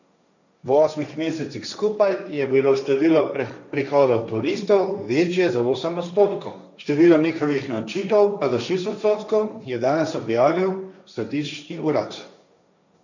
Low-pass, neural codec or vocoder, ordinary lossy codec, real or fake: 7.2 kHz; codec, 16 kHz, 1.1 kbps, Voila-Tokenizer; none; fake